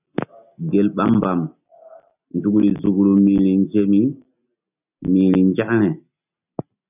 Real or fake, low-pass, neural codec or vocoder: real; 3.6 kHz; none